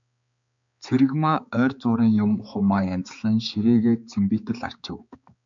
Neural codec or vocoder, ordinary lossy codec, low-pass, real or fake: codec, 16 kHz, 4 kbps, X-Codec, HuBERT features, trained on balanced general audio; MP3, 64 kbps; 7.2 kHz; fake